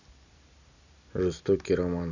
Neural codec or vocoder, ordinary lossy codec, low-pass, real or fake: none; none; 7.2 kHz; real